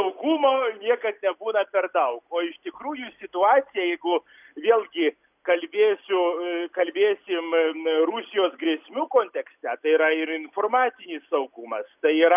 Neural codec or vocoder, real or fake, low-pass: none; real; 3.6 kHz